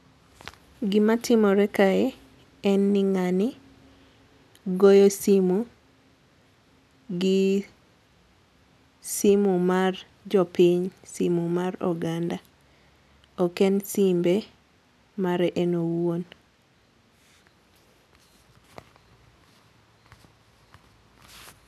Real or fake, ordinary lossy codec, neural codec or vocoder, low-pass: real; none; none; 14.4 kHz